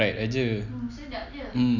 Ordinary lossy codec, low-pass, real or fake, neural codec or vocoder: none; 7.2 kHz; real; none